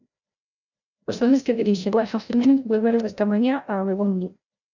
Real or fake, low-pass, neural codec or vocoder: fake; 7.2 kHz; codec, 16 kHz, 0.5 kbps, FreqCodec, larger model